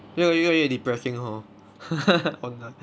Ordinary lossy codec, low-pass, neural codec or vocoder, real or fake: none; none; none; real